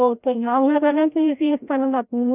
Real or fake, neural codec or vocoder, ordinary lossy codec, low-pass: fake; codec, 16 kHz, 0.5 kbps, FreqCodec, larger model; none; 3.6 kHz